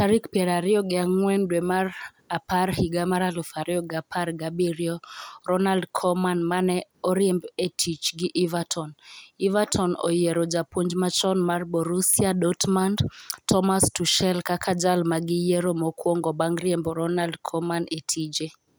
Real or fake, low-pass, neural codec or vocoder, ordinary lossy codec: real; none; none; none